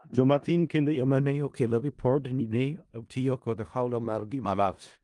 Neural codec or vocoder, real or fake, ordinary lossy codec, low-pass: codec, 16 kHz in and 24 kHz out, 0.4 kbps, LongCat-Audio-Codec, four codebook decoder; fake; Opus, 32 kbps; 10.8 kHz